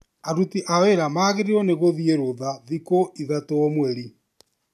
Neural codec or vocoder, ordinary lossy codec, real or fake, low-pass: none; AAC, 96 kbps; real; 14.4 kHz